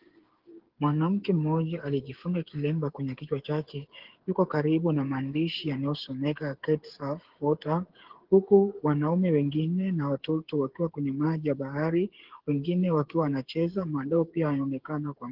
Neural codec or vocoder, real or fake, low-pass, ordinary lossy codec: codec, 16 kHz, 8 kbps, FreqCodec, smaller model; fake; 5.4 kHz; Opus, 16 kbps